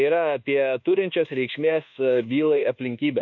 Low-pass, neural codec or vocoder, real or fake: 7.2 kHz; codec, 24 kHz, 1.2 kbps, DualCodec; fake